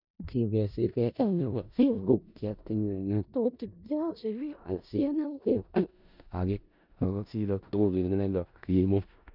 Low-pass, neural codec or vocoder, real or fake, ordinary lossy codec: 5.4 kHz; codec, 16 kHz in and 24 kHz out, 0.4 kbps, LongCat-Audio-Codec, four codebook decoder; fake; none